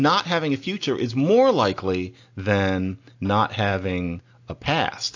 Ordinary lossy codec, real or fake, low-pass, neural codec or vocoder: AAC, 48 kbps; real; 7.2 kHz; none